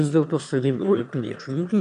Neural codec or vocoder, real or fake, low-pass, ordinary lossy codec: autoencoder, 22.05 kHz, a latent of 192 numbers a frame, VITS, trained on one speaker; fake; 9.9 kHz; MP3, 96 kbps